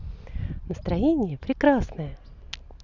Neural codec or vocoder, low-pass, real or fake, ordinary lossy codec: none; 7.2 kHz; real; Opus, 64 kbps